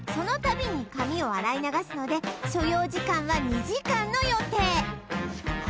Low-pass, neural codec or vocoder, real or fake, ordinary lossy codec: none; none; real; none